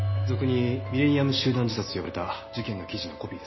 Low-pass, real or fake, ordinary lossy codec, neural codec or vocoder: 7.2 kHz; real; MP3, 24 kbps; none